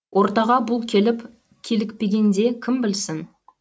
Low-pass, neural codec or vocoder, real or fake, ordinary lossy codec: none; none; real; none